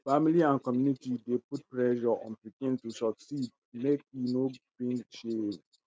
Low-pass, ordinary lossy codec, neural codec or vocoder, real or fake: none; none; none; real